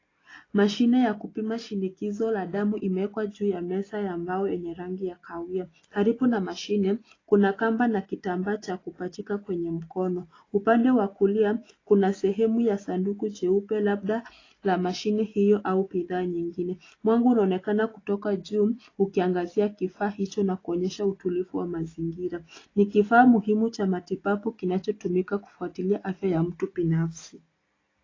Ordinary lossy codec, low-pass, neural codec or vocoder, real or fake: AAC, 32 kbps; 7.2 kHz; none; real